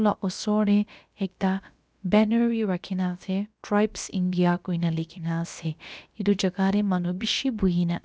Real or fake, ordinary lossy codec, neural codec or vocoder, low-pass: fake; none; codec, 16 kHz, about 1 kbps, DyCAST, with the encoder's durations; none